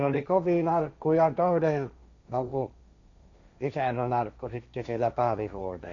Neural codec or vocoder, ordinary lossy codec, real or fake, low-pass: codec, 16 kHz, 1.1 kbps, Voila-Tokenizer; none; fake; 7.2 kHz